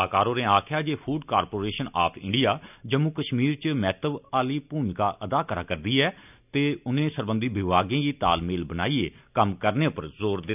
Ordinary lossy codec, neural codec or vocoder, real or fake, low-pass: none; none; real; 3.6 kHz